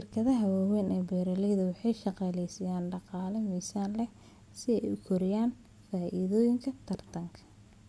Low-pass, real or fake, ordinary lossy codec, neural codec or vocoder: none; real; none; none